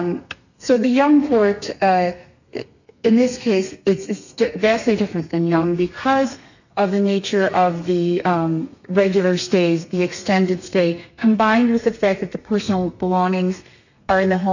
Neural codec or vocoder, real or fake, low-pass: codec, 32 kHz, 1.9 kbps, SNAC; fake; 7.2 kHz